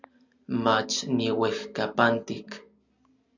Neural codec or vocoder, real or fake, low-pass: none; real; 7.2 kHz